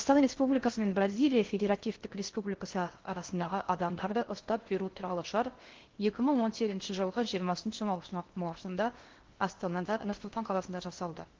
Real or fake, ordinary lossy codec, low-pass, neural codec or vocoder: fake; Opus, 16 kbps; 7.2 kHz; codec, 16 kHz in and 24 kHz out, 0.6 kbps, FocalCodec, streaming, 4096 codes